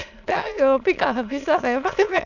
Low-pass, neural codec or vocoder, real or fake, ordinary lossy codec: 7.2 kHz; autoencoder, 22.05 kHz, a latent of 192 numbers a frame, VITS, trained on many speakers; fake; none